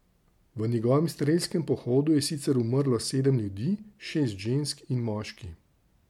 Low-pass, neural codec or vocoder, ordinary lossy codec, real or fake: 19.8 kHz; none; MP3, 96 kbps; real